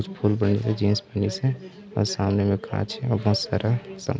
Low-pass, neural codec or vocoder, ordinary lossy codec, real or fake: none; none; none; real